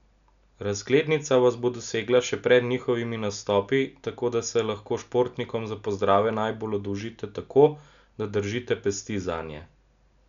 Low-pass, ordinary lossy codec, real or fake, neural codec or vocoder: 7.2 kHz; none; real; none